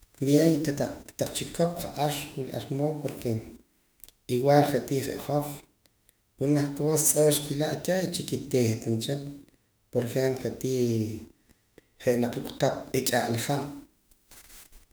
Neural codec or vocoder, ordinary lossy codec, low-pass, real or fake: autoencoder, 48 kHz, 32 numbers a frame, DAC-VAE, trained on Japanese speech; none; none; fake